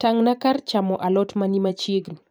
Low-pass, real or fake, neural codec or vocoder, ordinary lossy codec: none; fake; vocoder, 44.1 kHz, 128 mel bands every 512 samples, BigVGAN v2; none